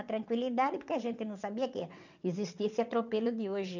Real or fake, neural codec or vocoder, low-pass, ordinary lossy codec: fake; vocoder, 22.05 kHz, 80 mel bands, WaveNeXt; 7.2 kHz; none